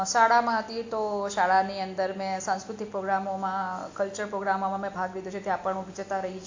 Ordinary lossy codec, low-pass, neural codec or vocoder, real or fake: AAC, 48 kbps; 7.2 kHz; none; real